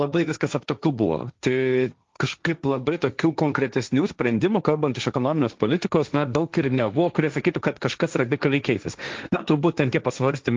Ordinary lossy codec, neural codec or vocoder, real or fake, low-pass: Opus, 32 kbps; codec, 16 kHz, 1.1 kbps, Voila-Tokenizer; fake; 7.2 kHz